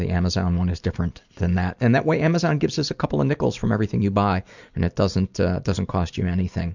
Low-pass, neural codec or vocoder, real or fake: 7.2 kHz; none; real